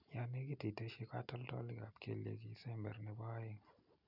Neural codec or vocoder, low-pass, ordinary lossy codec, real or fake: none; 5.4 kHz; none; real